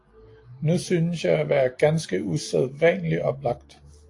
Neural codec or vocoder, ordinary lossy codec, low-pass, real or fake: none; AAC, 48 kbps; 10.8 kHz; real